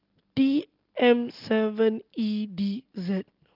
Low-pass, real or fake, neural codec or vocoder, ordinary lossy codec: 5.4 kHz; real; none; Opus, 32 kbps